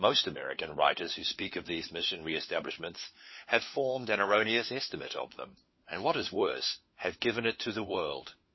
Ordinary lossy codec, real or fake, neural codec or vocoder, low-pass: MP3, 24 kbps; fake; codec, 16 kHz, 4 kbps, FunCodec, trained on LibriTTS, 50 frames a second; 7.2 kHz